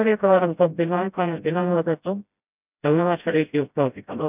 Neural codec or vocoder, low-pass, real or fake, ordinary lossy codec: codec, 16 kHz, 0.5 kbps, FreqCodec, smaller model; 3.6 kHz; fake; none